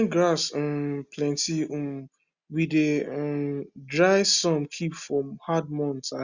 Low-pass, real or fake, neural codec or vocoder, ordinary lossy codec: 7.2 kHz; real; none; Opus, 64 kbps